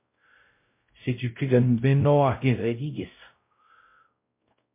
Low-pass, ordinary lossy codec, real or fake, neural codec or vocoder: 3.6 kHz; MP3, 24 kbps; fake; codec, 16 kHz, 0.5 kbps, X-Codec, WavLM features, trained on Multilingual LibriSpeech